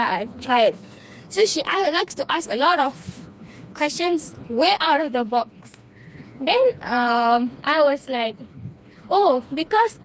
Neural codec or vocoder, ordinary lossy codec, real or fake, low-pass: codec, 16 kHz, 2 kbps, FreqCodec, smaller model; none; fake; none